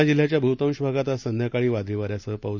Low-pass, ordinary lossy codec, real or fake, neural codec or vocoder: 7.2 kHz; none; real; none